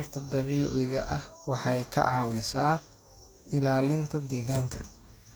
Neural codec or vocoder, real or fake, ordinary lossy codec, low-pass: codec, 44.1 kHz, 2.6 kbps, DAC; fake; none; none